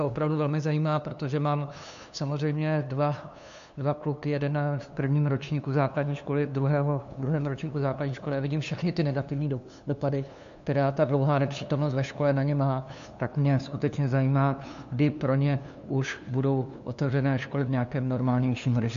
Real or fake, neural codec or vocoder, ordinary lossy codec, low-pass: fake; codec, 16 kHz, 2 kbps, FunCodec, trained on LibriTTS, 25 frames a second; MP3, 64 kbps; 7.2 kHz